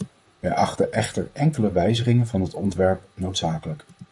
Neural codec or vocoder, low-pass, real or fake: vocoder, 44.1 kHz, 128 mel bands, Pupu-Vocoder; 10.8 kHz; fake